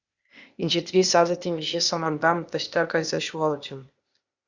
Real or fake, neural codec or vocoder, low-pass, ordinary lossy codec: fake; codec, 16 kHz, 0.8 kbps, ZipCodec; 7.2 kHz; Opus, 64 kbps